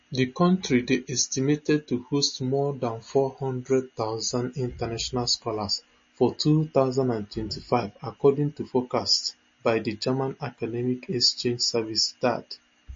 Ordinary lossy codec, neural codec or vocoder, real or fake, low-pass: MP3, 32 kbps; none; real; 7.2 kHz